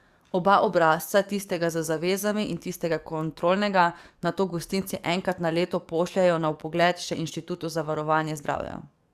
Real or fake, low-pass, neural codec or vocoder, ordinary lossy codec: fake; 14.4 kHz; codec, 44.1 kHz, 7.8 kbps, DAC; Opus, 64 kbps